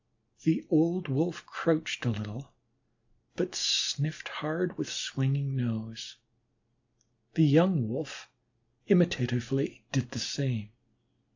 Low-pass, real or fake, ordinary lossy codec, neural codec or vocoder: 7.2 kHz; real; AAC, 48 kbps; none